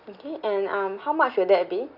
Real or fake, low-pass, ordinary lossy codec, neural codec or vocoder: real; 5.4 kHz; none; none